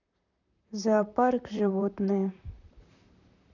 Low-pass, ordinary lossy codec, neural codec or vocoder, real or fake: 7.2 kHz; none; vocoder, 44.1 kHz, 128 mel bands, Pupu-Vocoder; fake